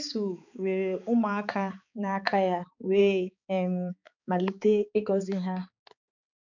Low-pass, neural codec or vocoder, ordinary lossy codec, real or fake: 7.2 kHz; codec, 16 kHz, 4 kbps, X-Codec, HuBERT features, trained on balanced general audio; none; fake